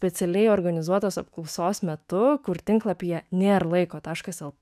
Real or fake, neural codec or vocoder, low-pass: fake; autoencoder, 48 kHz, 128 numbers a frame, DAC-VAE, trained on Japanese speech; 14.4 kHz